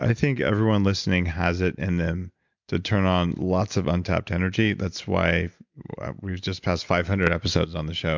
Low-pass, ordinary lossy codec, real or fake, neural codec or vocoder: 7.2 kHz; MP3, 64 kbps; real; none